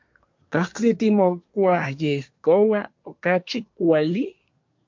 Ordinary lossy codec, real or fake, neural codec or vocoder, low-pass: MP3, 48 kbps; fake; codec, 24 kHz, 1 kbps, SNAC; 7.2 kHz